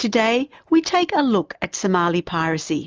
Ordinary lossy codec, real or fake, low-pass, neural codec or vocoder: Opus, 24 kbps; real; 7.2 kHz; none